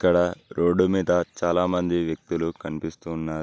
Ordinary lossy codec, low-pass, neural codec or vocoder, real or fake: none; none; none; real